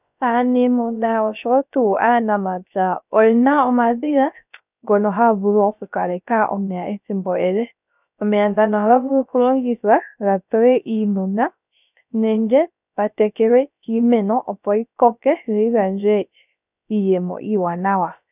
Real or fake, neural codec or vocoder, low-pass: fake; codec, 16 kHz, 0.3 kbps, FocalCodec; 3.6 kHz